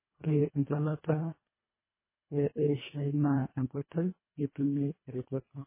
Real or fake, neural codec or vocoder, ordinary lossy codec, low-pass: fake; codec, 24 kHz, 1.5 kbps, HILCodec; MP3, 16 kbps; 3.6 kHz